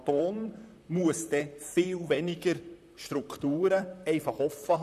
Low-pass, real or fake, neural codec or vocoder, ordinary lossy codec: 14.4 kHz; fake; vocoder, 44.1 kHz, 128 mel bands, Pupu-Vocoder; none